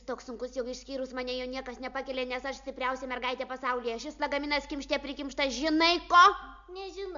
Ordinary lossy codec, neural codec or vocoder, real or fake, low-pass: MP3, 64 kbps; none; real; 7.2 kHz